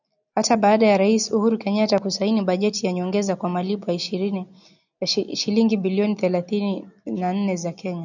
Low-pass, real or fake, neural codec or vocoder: 7.2 kHz; real; none